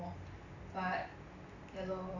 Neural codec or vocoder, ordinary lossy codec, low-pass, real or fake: none; none; 7.2 kHz; real